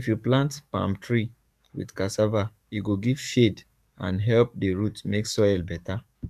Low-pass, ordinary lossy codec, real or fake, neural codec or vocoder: 14.4 kHz; MP3, 96 kbps; fake; codec, 44.1 kHz, 7.8 kbps, DAC